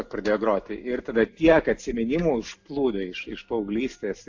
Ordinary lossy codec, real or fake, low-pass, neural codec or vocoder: AAC, 48 kbps; real; 7.2 kHz; none